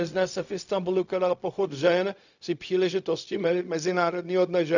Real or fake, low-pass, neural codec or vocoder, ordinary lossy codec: fake; 7.2 kHz; codec, 16 kHz, 0.4 kbps, LongCat-Audio-Codec; none